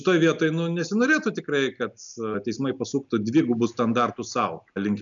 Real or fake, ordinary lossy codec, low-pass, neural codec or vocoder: real; MP3, 96 kbps; 7.2 kHz; none